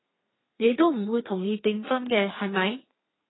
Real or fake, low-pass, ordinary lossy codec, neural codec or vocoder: fake; 7.2 kHz; AAC, 16 kbps; codec, 32 kHz, 1.9 kbps, SNAC